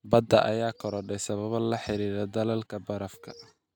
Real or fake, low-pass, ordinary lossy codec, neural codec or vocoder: real; none; none; none